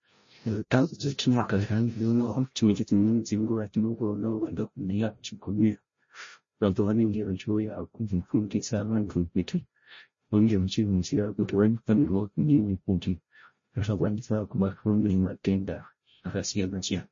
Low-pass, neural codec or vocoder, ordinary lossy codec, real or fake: 7.2 kHz; codec, 16 kHz, 0.5 kbps, FreqCodec, larger model; MP3, 32 kbps; fake